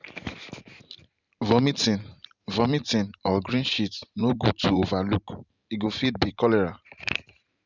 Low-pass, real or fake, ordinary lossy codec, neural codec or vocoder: 7.2 kHz; fake; none; vocoder, 44.1 kHz, 128 mel bands every 512 samples, BigVGAN v2